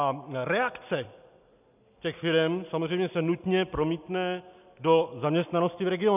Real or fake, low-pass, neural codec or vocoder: real; 3.6 kHz; none